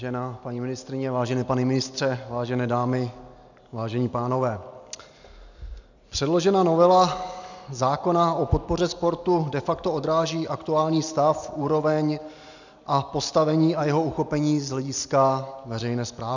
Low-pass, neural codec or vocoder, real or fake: 7.2 kHz; none; real